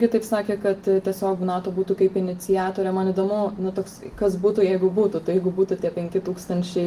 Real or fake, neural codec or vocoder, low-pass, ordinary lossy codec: real; none; 14.4 kHz; Opus, 24 kbps